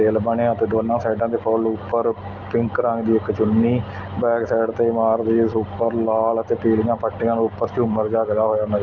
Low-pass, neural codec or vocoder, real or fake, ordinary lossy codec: 7.2 kHz; none; real; Opus, 32 kbps